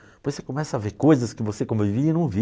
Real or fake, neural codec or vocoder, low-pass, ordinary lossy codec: real; none; none; none